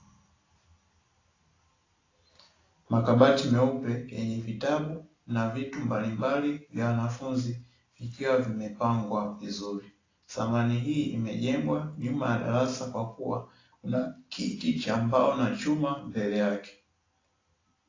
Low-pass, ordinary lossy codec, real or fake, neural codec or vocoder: 7.2 kHz; AAC, 32 kbps; real; none